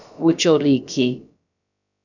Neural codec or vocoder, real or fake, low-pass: codec, 16 kHz, about 1 kbps, DyCAST, with the encoder's durations; fake; 7.2 kHz